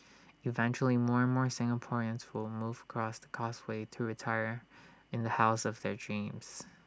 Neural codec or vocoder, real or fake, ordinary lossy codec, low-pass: none; real; none; none